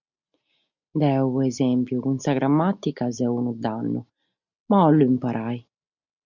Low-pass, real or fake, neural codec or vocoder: 7.2 kHz; real; none